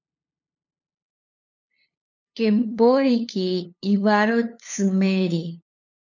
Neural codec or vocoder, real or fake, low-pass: codec, 16 kHz, 2 kbps, FunCodec, trained on LibriTTS, 25 frames a second; fake; 7.2 kHz